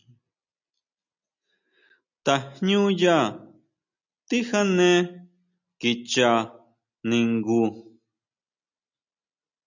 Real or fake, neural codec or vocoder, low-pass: real; none; 7.2 kHz